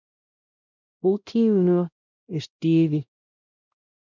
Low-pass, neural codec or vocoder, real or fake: 7.2 kHz; codec, 16 kHz, 0.5 kbps, X-Codec, WavLM features, trained on Multilingual LibriSpeech; fake